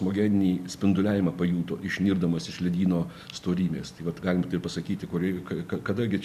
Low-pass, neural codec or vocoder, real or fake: 14.4 kHz; none; real